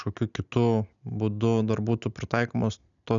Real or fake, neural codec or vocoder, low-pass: real; none; 7.2 kHz